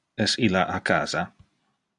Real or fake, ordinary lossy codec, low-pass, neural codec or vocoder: real; AAC, 64 kbps; 10.8 kHz; none